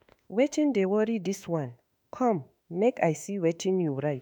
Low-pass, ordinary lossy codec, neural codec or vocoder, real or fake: none; none; autoencoder, 48 kHz, 32 numbers a frame, DAC-VAE, trained on Japanese speech; fake